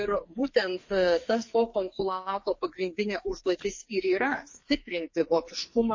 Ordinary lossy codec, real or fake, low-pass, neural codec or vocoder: MP3, 32 kbps; fake; 7.2 kHz; codec, 44.1 kHz, 2.6 kbps, SNAC